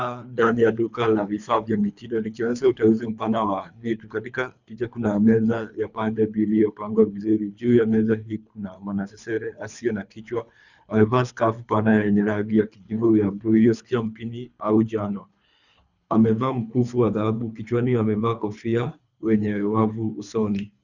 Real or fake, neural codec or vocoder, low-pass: fake; codec, 24 kHz, 3 kbps, HILCodec; 7.2 kHz